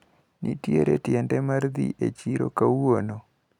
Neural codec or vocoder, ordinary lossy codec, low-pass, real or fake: none; none; 19.8 kHz; real